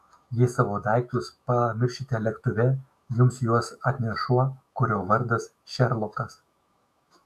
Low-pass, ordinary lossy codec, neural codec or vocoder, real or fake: 14.4 kHz; AAC, 96 kbps; autoencoder, 48 kHz, 128 numbers a frame, DAC-VAE, trained on Japanese speech; fake